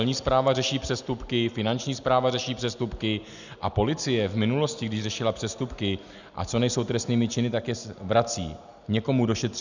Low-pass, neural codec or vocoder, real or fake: 7.2 kHz; none; real